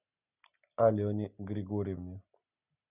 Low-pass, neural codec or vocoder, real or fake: 3.6 kHz; none; real